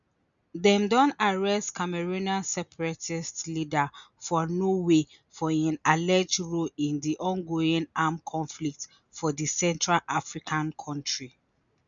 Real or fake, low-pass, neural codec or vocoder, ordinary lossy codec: real; 7.2 kHz; none; none